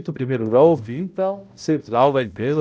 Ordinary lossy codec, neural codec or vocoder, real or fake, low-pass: none; codec, 16 kHz, 0.5 kbps, X-Codec, HuBERT features, trained on balanced general audio; fake; none